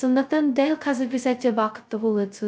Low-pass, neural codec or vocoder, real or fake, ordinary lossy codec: none; codec, 16 kHz, 0.2 kbps, FocalCodec; fake; none